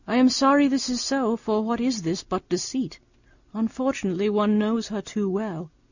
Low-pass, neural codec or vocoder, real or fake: 7.2 kHz; none; real